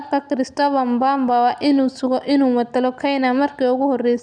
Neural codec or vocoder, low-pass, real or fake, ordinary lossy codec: none; 9.9 kHz; real; none